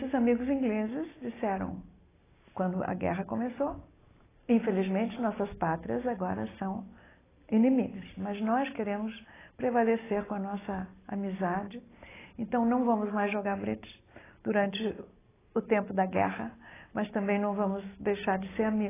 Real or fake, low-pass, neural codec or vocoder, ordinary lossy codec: real; 3.6 kHz; none; AAC, 16 kbps